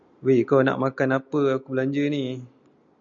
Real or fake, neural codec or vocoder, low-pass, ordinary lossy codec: real; none; 7.2 kHz; MP3, 64 kbps